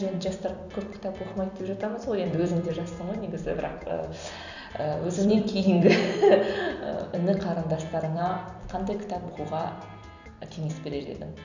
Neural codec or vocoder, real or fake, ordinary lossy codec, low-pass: vocoder, 44.1 kHz, 128 mel bands every 256 samples, BigVGAN v2; fake; none; 7.2 kHz